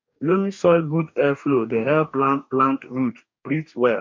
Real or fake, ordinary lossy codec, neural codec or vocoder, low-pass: fake; MP3, 64 kbps; codec, 44.1 kHz, 2.6 kbps, DAC; 7.2 kHz